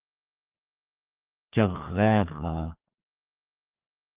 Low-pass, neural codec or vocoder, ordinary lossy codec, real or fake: 3.6 kHz; codec, 16 kHz, 2 kbps, FreqCodec, larger model; Opus, 24 kbps; fake